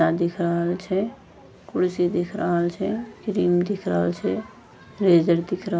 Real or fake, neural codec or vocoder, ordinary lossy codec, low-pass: real; none; none; none